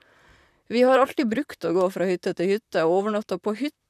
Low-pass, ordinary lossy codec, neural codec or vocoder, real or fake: 14.4 kHz; none; vocoder, 44.1 kHz, 128 mel bands, Pupu-Vocoder; fake